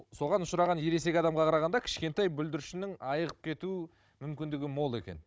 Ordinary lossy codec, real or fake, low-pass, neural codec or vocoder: none; fake; none; codec, 16 kHz, 16 kbps, FunCodec, trained on Chinese and English, 50 frames a second